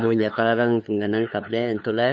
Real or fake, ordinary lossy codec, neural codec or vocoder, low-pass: fake; none; codec, 16 kHz, 2 kbps, FunCodec, trained on LibriTTS, 25 frames a second; none